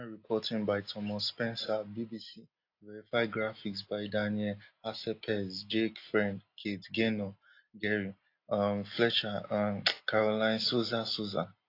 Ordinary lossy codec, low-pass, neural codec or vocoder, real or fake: AAC, 32 kbps; 5.4 kHz; none; real